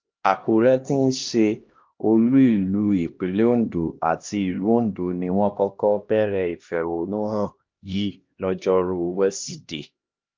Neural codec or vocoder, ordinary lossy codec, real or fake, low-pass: codec, 16 kHz, 1 kbps, X-Codec, HuBERT features, trained on LibriSpeech; Opus, 32 kbps; fake; 7.2 kHz